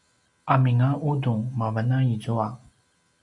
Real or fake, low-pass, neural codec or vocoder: real; 10.8 kHz; none